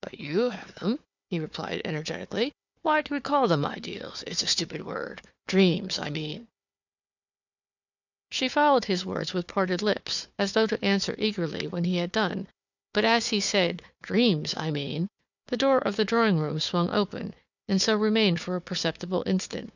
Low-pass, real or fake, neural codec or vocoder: 7.2 kHz; fake; codec, 16 kHz, 4 kbps, FunCodec, trained on Chinese and English, 50 frames a second